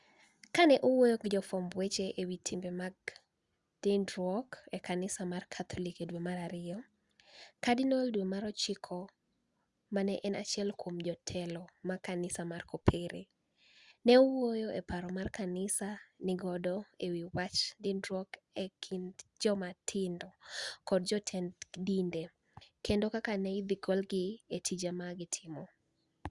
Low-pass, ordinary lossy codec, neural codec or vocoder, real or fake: 10.8 kHz; Opus, 64 kbps; none; real